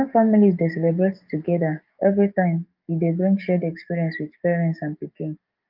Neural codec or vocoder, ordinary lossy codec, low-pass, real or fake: codec, 44.1 kHz, 7.8 kbps, DAC; Opus, 24 kbps; 5.4 kHz; fake